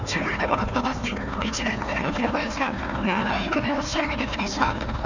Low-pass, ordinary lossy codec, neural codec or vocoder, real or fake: 7.2 kHz; none; codec, 16 kHz, 1 kbps, FunCodec, trained on Chinese and English, 50 frames a second; fake